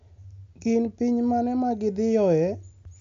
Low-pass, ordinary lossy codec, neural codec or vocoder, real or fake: 7.2 kHz; none; none; real